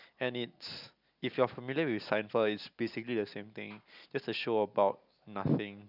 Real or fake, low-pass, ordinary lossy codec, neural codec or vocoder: real; 5.4 kHz; none; none